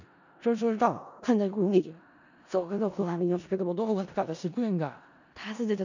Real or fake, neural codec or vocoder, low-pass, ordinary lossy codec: fake; codec, 16 kHz in and 24 kHz out, 0.4 kbps, LongCat-Audio-Codec, four codebook decoder; 7.2 kHz; none